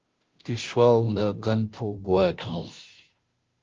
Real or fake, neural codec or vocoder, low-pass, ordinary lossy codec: fake; codec, 16 kHz, 0.5 kbps, FunCodec, trained on Chinese and English, 25 frames a second; 7.2 kHz; Opus, 32 kbps